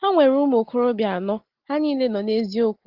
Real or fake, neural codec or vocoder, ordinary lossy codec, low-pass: real; none; Opus, 16 kbps; 5.4 kHz